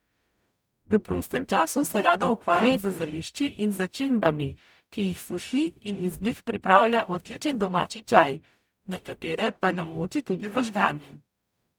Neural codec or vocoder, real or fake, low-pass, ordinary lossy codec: codec, 44.1 kHz, 0.9 kbps, DAC; fake; none; none